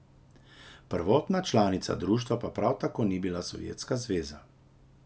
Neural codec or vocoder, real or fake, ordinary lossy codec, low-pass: none; real; none; none